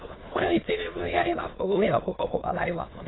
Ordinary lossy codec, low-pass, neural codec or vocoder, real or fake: AAC, 16 kbps; 7.2 kHz; autoencoder, 22.05 kHz, a latent of 192 numbers a frame, VITS, trained on many speakers; fake